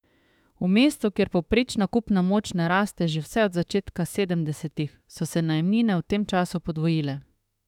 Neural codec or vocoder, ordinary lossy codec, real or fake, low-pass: autoencoder, 48 kHz, 32 numbers a frame, DAC-VAE, trained on Japanese speech; none; fake; 19.8 kHz